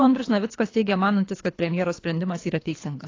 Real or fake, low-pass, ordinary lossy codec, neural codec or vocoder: fake; 7.2 kHz; AAC, 32 kbps; codec, 24 kHz, 3 kbps, HILCodec